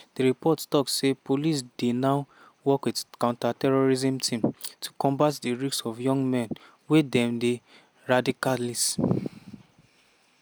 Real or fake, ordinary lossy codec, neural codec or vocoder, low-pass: real; none; none; none